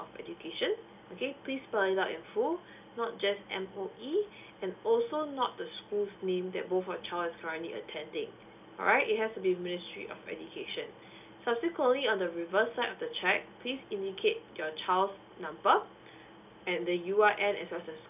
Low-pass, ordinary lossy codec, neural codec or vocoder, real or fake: 3.6 kHz; none; none; real